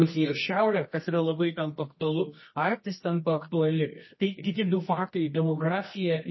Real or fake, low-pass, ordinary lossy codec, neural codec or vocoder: fake; 7.2 kHz; MP3, 24 kbps; codec, 24 kHz, 0.9 kbps, WavTokenizer, medium music audio release